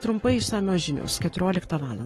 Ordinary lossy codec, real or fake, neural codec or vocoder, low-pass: AAC, 32 kbps; fake; codec, 44.1 kHz, 7.8 kbps, Pupu-Codec; 19.8 kHz